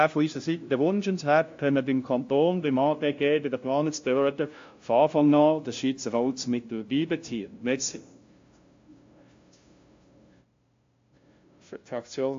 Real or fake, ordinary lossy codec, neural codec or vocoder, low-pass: fake; AAC, 48 kbps; codec, 16 kHz, 0.5 kbps, FunCodec, trained on LibriTTS, 25 frames a second; 7.2 kHz